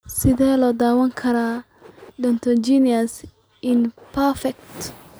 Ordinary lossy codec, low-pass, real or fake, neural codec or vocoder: none; none; real; none